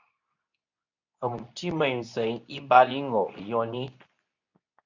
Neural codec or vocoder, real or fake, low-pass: codec, 24 kHz, 0.9 kbps, WavTokenizer, medium speech release version 2; fake; 7.2 kHz